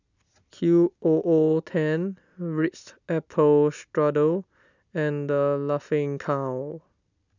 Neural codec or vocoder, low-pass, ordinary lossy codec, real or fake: none; 7.2 kHz; none; real